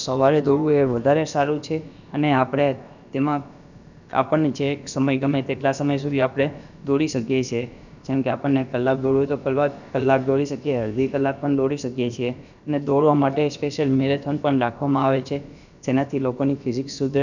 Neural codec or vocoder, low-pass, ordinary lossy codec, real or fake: codec, 16 kHz, about 1 kbps, DyCAST, with the encoder's durations; 7.2 kHz; none; fake